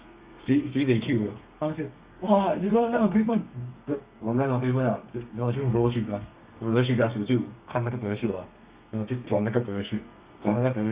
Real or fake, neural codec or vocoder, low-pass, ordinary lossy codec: fake; codec, 32 kHz, 1.9 kbps, SNAC; 3.6 kHz; Opus, 64 kbps